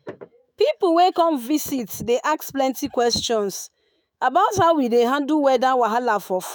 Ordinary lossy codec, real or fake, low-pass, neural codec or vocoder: none; fake; none; autoencoder, 48 kHz, 128 numbers a frame, DAC-VAE, trained on Japanese speech